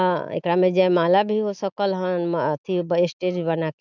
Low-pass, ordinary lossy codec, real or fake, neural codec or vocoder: 7.2 kHz; none; real; none